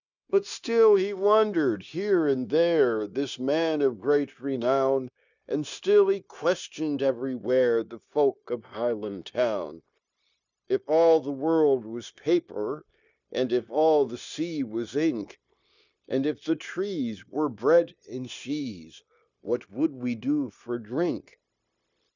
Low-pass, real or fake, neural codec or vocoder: 7.2 kHz; fake; codec, 16 kHz, 0.9 kbps, LongCat-Audio-Codec